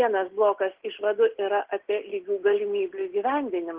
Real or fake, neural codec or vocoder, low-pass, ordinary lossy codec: real; none; 3.6 kHz; Opus, 16 kbps